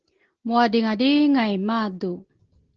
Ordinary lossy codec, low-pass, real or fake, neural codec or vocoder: Opus, 16 kbps; 7.2 kHz; real; none